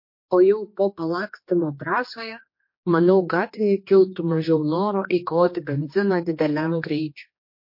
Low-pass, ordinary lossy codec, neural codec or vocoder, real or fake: 5.4 kHz; MP3, 32 kbps; codec, 16 kHz, 2 kbps, X-Codec, HuBERT features, trained on general audio; fake